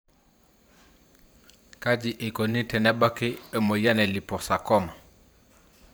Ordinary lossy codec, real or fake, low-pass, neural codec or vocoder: none; fake; none; vocoder, 44.1 kHz, 128 mel bands every 512 samples, BigVGAN v2